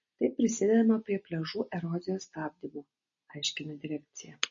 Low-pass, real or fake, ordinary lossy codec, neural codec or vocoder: 7.2 kHz; real; MP3, 32 kbps; none